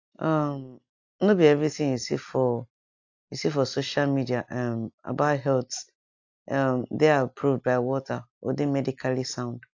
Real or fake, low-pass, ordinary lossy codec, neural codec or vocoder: real; 7.2 kHz; AAC, 48 kbps; none